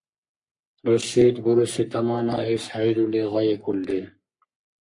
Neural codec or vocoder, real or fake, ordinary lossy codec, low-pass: codec, 44.1 kHz, 3.4 kbps, Pupu-Codec; fake; MP3, 48 kbps; 10.8 kHz